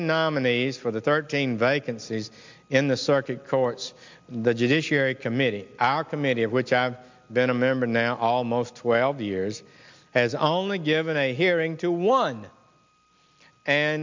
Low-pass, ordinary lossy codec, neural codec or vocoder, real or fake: 7.2 kHz; MP3, 64 kbps; none; real